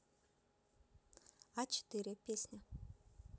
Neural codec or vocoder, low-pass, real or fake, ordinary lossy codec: none; none; real; none